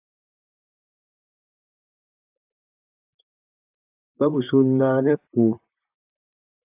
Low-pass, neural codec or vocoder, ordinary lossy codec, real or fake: 3.6 kHz; vocoder, 44.1 kHz, 128 mel bands, Pupu-Vocoder; AAC, 32 kbps; fake